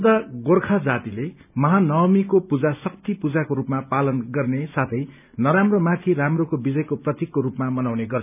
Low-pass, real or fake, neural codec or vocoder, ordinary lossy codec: 3.6 kHz; real; none; none